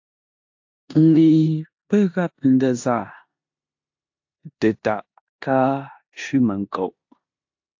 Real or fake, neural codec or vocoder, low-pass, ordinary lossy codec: fake; codec, 16 kHz in and 24 kHz out, 0.9 kbps, LongCat-Audio-Codec, four codebook decoder; 7.2 kHz; AAC, 48 kbps